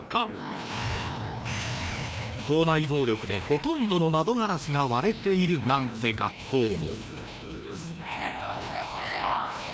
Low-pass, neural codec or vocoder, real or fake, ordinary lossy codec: none; codec, 16 kHz, 1 kbps, FreqCodec, larger model; fake; none